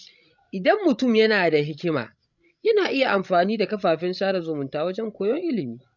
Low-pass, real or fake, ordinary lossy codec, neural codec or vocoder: 7.2 kHz; fake; none; codec, 16 kHz, 16 kbps, FreqCodec, larger model